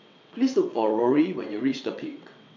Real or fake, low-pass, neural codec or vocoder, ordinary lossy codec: fake; 7.2 kHz; vocoder, 22.05 kHz, 80 mel bands, WaveNeXt; MP3, 64 kbps